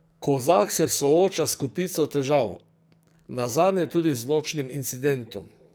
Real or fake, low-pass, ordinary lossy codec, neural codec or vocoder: fake; none; none; codec, 44.1 kHz, 2.6 kbps, SNAC